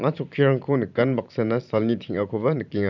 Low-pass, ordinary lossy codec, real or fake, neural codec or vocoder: 7.2 kHz; none; fake; vocoder, 44.1 kHz, 128 mel bands every 512 samples, BigVGAN v2